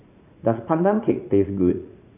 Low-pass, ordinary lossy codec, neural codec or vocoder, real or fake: 3.6 kHz; none; vocoder, 44.1 kHz, 80 mel bands, Vocos; fake